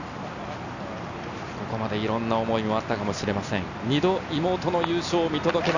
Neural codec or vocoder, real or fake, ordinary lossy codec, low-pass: none; real; none; 7.2 kHz